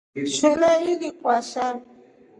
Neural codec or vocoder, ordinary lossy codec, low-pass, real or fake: codec, 44.1 kHz, 7.8 kbps, Pupu-Codec; Opus, 64 kbps; 10.8 kHz; fake